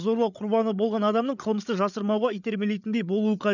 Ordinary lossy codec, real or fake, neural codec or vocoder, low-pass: none; fake; codec, 16 kHz, 8 kbps, FreqCodec, larger model; 7.2 kHz